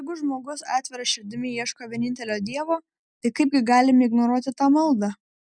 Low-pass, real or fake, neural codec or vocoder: 9.9 kHz; real; none